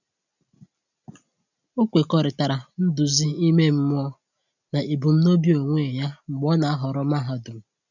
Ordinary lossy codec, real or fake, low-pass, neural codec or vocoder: none; real; 7.2 kHz; none